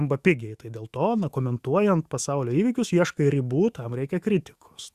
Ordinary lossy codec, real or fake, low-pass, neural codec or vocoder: Opus, 64 kbps; fake; 14.4 kHz; autoencoder, 48 kHz, 128 numbers a frame, DAC-VAE, trained on Japanese speech